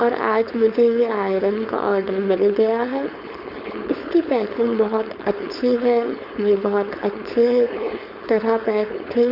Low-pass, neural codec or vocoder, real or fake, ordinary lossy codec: 5.4 kHz; codec, 16 kHz, 4.8 kbps, FACodec; fake; none